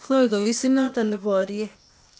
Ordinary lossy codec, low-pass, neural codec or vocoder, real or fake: none; none; codec, 16 kHz, 0.8 kbps, ZipCodec; fake